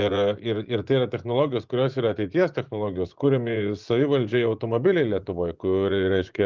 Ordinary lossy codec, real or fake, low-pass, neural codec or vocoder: Opus, 24 kbps; fake; 7.2 kHz; vocoder, 24 kHz, 100 mel bands, Vocos